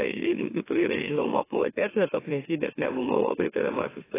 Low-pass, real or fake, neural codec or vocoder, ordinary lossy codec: 3.6 kHz; fake; autoencoder, 44.1 kHz, a latent of 192 numbers a frame, MeloTTS; AAC, 16 kbps